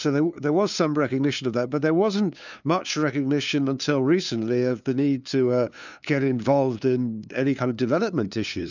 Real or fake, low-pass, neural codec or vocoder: fake; 7.2 kHz; codec, 16 kHz, 2 kbps, FunCodec, trained on LibriTTS, 25 frames a second